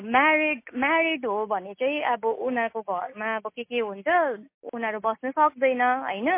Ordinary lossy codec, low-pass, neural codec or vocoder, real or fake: MP3, 24 kbps; 3.6 kHz; none; real